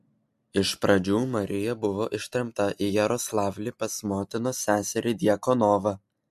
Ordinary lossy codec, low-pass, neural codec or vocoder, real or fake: MP3, 64 kbps; 14.4 kHz; none; real